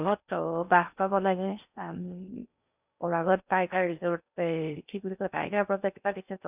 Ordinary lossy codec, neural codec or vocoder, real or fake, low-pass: none; codec, 16 kHz in and 24 kHz out, 0.6 kbps, FocalCodec, streaming, 4096 codes; fake; 3.6 kHz